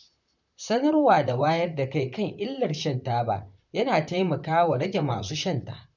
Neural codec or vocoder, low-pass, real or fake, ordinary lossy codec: vocoder, 44.1 kHz, 128 mel bands, Pupu-Vocoder; 7.2 kHz; fake; none